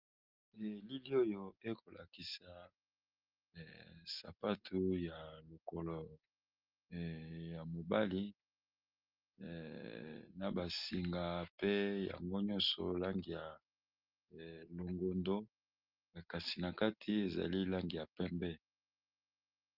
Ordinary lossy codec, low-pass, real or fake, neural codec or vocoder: Opus, 24 kbps; 5.4 kHz; real; none